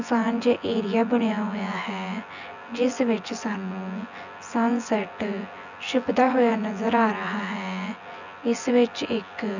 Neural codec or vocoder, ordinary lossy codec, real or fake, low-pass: vocoder, 24 kHz, 100 mel bands, Vocos; none; fake; 7.2 kHz